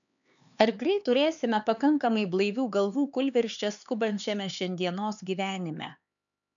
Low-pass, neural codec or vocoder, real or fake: 7.2 kHz; codec, 16 kHz, 4 kbps, X-Codec, HuBERT features, trained on LibriSpeech; fake